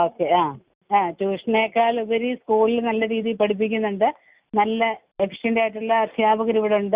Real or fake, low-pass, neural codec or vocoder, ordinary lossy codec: real; 3.6 kHz; none; Opus, 64 kbps